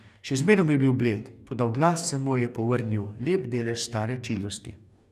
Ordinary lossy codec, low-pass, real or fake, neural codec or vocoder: none; 14.4 kHz; fake; codec, 44.1 kHz, 2.6 kbps, DAC